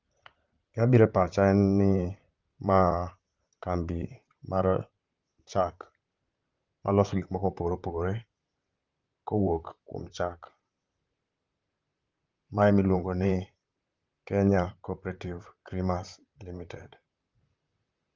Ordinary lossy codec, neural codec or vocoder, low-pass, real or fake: Opus, 32 kbps; vocoder, 44.1 kHz, 128 mel bands, Pupu-Vocoder; 7.2 kHz; fake